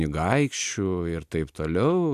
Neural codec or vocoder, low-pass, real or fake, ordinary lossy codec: none; 14.4 kHz; real; AAC, 96 kbps